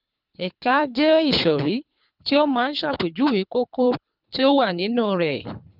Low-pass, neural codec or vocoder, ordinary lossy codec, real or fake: 5.4 kHz; codec, 24 kHz, 3 kbps, HILCodec; none; fake